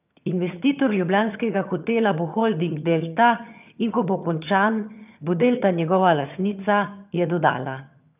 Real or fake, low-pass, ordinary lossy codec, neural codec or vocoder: fake; 3.6 kHz; none; vocoder, 22.05 kHz, 80 mel bands, HiFi-GAN